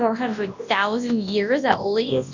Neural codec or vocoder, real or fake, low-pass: codec, 24 kHz, 0.9 kbps, WavTokenizer, large speech release; fake; 7.2 kHz